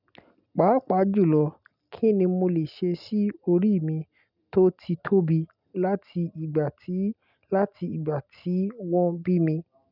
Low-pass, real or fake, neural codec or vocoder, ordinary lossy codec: 5.4 kHz; real; none; none